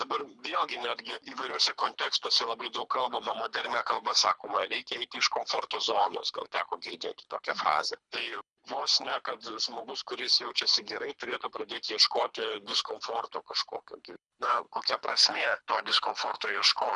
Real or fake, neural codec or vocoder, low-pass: fake; codec, 24 kHz, 3 kbps, HILCodec; 10.8 kHz